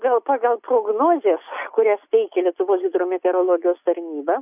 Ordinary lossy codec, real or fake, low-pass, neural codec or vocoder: AAC, 32 kbps; real; 3.6 kHz; none